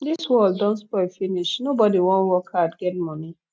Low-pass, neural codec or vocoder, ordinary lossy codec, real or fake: none; none; none; real